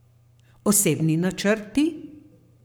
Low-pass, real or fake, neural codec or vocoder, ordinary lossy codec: none; fake; codec, 44.1 kHz, 7.8 kbps, Pupu-Codec; none